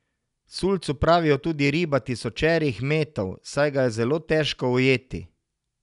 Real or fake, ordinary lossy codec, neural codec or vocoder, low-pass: real; none; none; 10.8 kHz